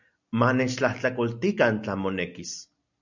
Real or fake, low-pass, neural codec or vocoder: real; 7.2 kHz; none